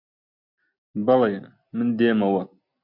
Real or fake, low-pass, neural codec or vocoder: real; 5.4 kHz; none